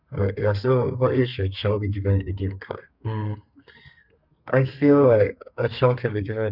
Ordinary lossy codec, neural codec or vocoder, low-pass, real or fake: none; codec, 32 kHz, 1.9 kbps, SNAC; 5.4 kHz; fake